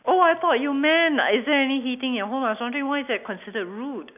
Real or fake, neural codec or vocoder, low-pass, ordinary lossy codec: real; none; 3.6 kHz; none